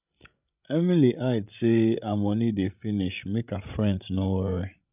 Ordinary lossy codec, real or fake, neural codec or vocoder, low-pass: none; fake; codec, 16 kHz, 16 kbps, FreqCodec, larger model; 3.6 kHz